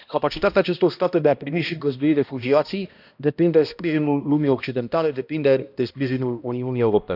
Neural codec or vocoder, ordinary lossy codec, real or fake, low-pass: codec, 16 kHz, 1 kbps, X-Codec, HuBERT features, trained on balanced general audio; none; fake; 5.4 kHz